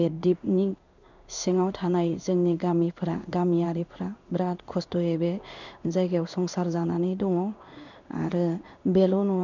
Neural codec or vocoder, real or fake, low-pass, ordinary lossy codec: codec, 16 kHz in and 24 kHz out, 1 kbps, XY-Tokenizer; fake; 7.2 kHz; none